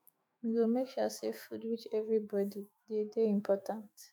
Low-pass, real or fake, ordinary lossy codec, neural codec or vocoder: none; fake; none; autoencoder, 48 kHz, 128 numbers a frame, DAC-VAE, trained on Japanese speech